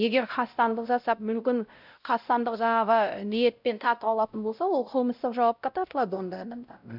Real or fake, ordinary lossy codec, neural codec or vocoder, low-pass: fake; none; codec, 16 kHz, 0.5 kbps, X-Codec, WavLM features, trained on Multilingual LibriSpeech; 5.4 kHz